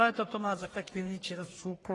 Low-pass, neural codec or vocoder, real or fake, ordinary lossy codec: 10.8 kHz; codec, 44.1 kHz, 1.7 kbps, Pupu-Codec; fake; AAC, 32 kbps